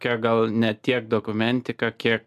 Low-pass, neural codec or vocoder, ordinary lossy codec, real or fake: 14.4 kHz; none; AAC, 96 kbps; real